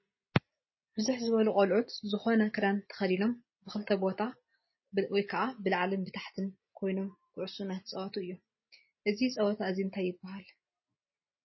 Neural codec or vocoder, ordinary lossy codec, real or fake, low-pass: none; MP3, 24 kbps; real; 7.2 kHz